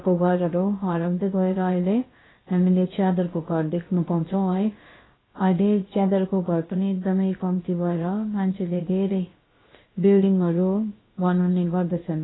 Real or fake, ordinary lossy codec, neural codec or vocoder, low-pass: fake; AAC, 16 kbps; codec, 16 kHz, about 1 kbps, DyCAST, with the encoder's durations; 7.2 kHz